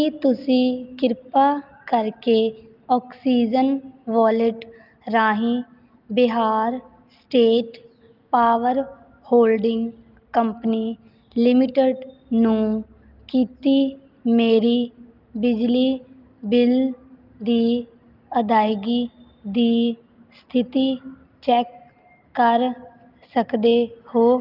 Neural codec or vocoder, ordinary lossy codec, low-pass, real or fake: none; Opus, 24 kbps; 5.4 kHz; real